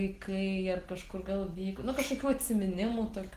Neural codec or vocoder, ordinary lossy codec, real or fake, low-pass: none; Opus, 16 kbps; real; 14.4 kHz